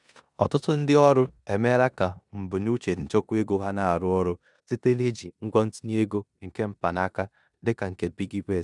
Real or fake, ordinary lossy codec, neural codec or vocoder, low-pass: fake; none; codec, 16 kHz in and 24 kHz out, 0.9 kbps, LongCat-Audio-Codec, four codebook decoder; 10.8 kHz